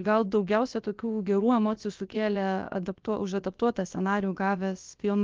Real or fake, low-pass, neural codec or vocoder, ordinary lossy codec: fake; 7.2 kHz; codec, 16 kHz, about 1 kbps, DyCAST, with the encoder's durations; Opus, 32 kbps